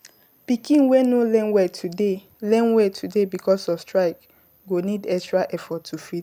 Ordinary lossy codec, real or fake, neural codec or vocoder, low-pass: none; real; none; 19.8 kHz